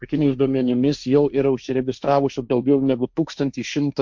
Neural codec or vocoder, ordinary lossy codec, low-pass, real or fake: codec, 16 kHz, 1.1 kbps, Voila-Tokenizer; MP3, 64 kbps; 7.2 kHz; fake